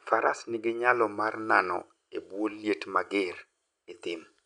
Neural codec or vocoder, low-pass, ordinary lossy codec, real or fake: none; 9.9 kHz; none; real